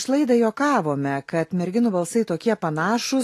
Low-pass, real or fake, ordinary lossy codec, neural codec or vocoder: 14.4 kHz; real; AAC, 48 kbps; none